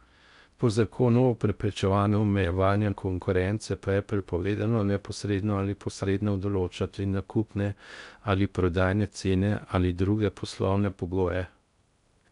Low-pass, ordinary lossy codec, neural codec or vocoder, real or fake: 10.8 kHz; none; codec, 16 kHz in and 24 kHz out, 0.6 kbps, FocalCodec, streaming, 4096 codes; fake